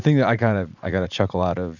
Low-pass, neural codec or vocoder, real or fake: 7.2 kHz; vocoder, 44.1 kHz, 80 mel bands, Vocos; fake